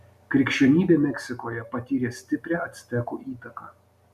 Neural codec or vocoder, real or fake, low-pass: none; real; 14.4 kHz